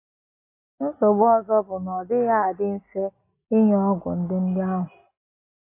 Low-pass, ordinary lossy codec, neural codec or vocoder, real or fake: 3.6 kHz; AAC, 24 kbps; none; real